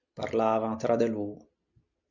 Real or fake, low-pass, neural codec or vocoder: real; 7.2 kHz; none